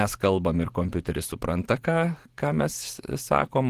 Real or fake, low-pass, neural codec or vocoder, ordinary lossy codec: fake; 14.4 kHz; vocoder, 44.1 kHz, 128 mel bands every 512 samples, BigVGAN v2; Opus, 16 kbps